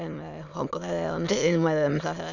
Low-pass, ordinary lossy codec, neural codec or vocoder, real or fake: 7.2 kHz; none; autoencoder, 22.05 kHz, a latent of 192 numbers a frame, VITS, trained on many speakers; fake